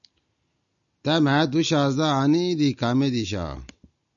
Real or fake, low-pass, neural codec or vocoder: real; 7.2 kHz; none